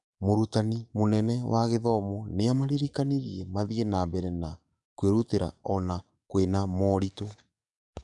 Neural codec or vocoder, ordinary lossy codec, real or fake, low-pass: codec, 44.1 kHz, 7.8 kbps, DAC; none; fake; 10.8 kHz